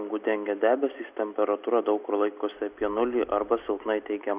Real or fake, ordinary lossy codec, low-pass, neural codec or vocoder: real; Opus, 64 kbps; 3.6 kHz; none